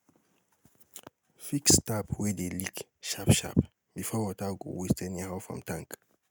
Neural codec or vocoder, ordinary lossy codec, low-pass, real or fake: none; none; none; real